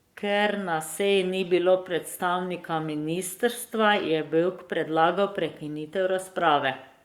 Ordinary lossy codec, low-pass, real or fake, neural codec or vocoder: Opus, 64 kbps; 19.8 kHz; fake; codec, 44.1 kHz, 7.8 kbps, Pupu-Codec